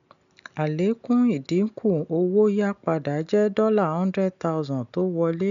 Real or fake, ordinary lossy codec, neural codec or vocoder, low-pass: real; none; none; 7.2 kHz